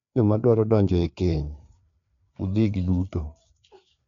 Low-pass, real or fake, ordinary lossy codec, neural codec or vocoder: 7.2 kHz; fake; none; codec, 16 kHz, 4 kbps, FreqCodec, larger model